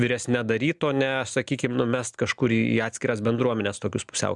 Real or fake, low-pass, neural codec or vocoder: real; 10.8 kHz; none